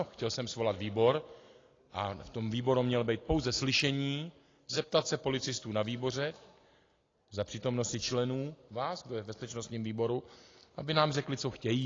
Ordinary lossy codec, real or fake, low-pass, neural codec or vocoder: AAC, 32 kbps; real; 7.2 kHz; none